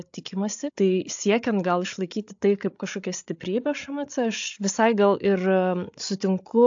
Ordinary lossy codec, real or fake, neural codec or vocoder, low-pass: AAC, 64 kbps; fake; codec, 16 kHz, 8 kbps, FreqCodec, larger model; 7.2 kHz